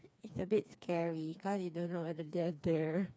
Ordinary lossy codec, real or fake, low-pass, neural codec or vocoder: none; fake; none; codec, 16 kHz, 4 kbps, FreqCodec, smaller model